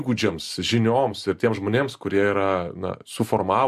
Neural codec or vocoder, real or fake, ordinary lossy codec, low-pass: none; real; MP3, 64 kbps; 14.4 kHz